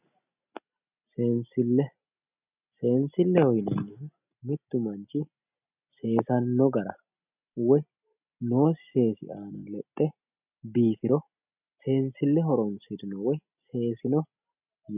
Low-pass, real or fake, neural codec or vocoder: 3.6 kHz; real; none